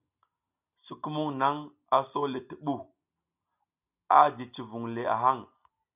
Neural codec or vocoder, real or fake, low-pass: none; real; 3.6 kHz